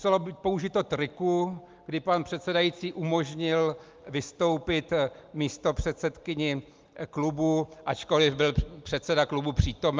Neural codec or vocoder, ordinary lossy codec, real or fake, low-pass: none; Opus, 32 kbps; real; 7.2 kHz